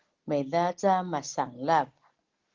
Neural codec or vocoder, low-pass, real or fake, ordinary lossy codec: none; 7.2 kHz; real; Opus, 16 kbps